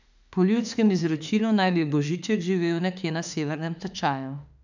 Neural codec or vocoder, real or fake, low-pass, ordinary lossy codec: autoencoder, 48 kHz, 32 numbers a frame, DAC-VAE, trained on Japanese speech; fake; 7.2 kHz; none